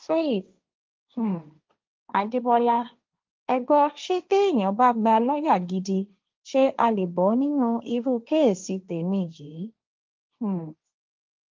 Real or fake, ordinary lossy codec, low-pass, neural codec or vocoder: fake; Opus, 24 kbps; 7.2 kHz; codec, 16 kHz, 1.1 kbps, Voila-Tokenizer